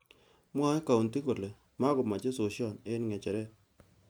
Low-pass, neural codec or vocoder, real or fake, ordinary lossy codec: none; none; real; none